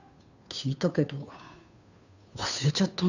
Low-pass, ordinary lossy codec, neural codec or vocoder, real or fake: 7.2 kHz; none; codec, 16 kHz, 2 kbps, FunCodec, trained on Chinese and English, 25 frames a second; fake